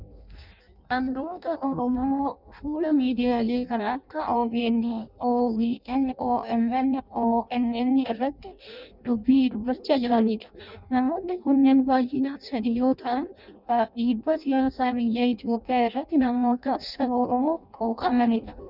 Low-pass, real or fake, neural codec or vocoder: 5.4 kHz; fake; codec, 16 kHz in and 24 kHz out, 0.6 kbps, FireRedTTS-2 codec